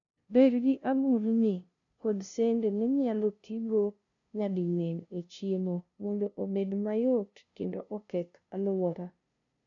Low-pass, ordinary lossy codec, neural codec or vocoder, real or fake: 7.2 kHz; none; codec, 16 kHz, 0.5 kbps, FunCodec, trained on LibriTTS, 25 frames a second; fake